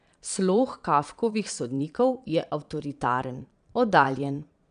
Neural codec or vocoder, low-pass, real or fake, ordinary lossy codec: vocoder, 22.05 kHz, 80 mel bands, Vocos; 9.9 kHz; fake; none